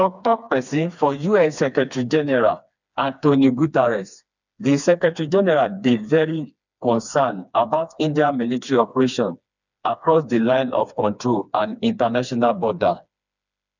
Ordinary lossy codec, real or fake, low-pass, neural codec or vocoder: none; fake; 7.2 kHz; codec, 16 kHz, 2 kbps, FreqCodec, smaller model